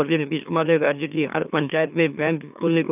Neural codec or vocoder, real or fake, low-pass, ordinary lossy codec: autoencoder, 44.1 kHz, a latent of 192 numbers a frame, MeloTTS; fake; 3.6 kHz; none